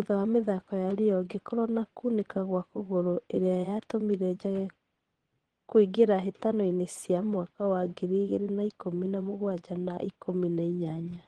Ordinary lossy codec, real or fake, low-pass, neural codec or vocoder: Opus, 16 kbps; fake; 9.9 kHz; vocoder, 22.05 kHz, 80 mel bands, Vocos